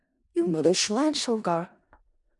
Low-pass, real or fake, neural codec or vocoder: 10.8 kHz; fake; codec, 16 kHz in and 24 kHz out, 0.4 kbps, LongCat-Audio-Codec, four codebook decoder